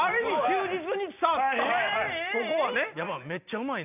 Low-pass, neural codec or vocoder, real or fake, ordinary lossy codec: 3.6 kHz; none; real; none